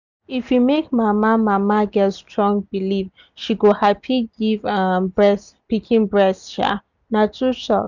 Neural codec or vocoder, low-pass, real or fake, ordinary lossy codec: none; 7.2 kHz; real; none